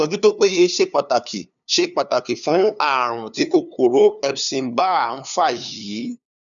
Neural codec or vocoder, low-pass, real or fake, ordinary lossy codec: codec, 16 kHz, 2 kbps, FunCodec, trained on LibriTTS, 25 frames a second; 7.2 kHz; fake; none